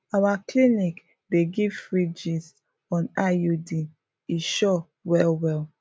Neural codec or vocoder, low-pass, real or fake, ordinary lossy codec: none; none; real; none